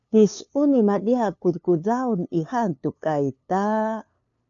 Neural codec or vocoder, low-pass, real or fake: codec, 16 kHz, 2 kbps, FunCodec, trained on LibriTTS, 25 frames a second; 7.2 kHz; fake